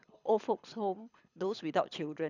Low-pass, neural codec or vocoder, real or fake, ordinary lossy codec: 7.2 kHz; codec, 24 kHz, 6 kbps, HILCodec; fake; none